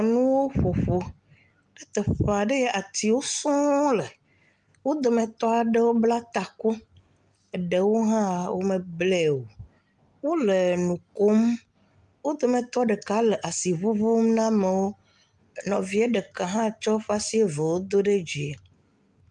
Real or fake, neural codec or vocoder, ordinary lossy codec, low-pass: real; none; Opus, 32 kbps; 10.8 kHz